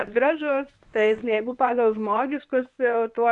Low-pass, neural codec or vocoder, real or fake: 10.8 kHz; codec, 24 kHz, 0.9 kbps, WavTokenizer, medium speech release version 1; fake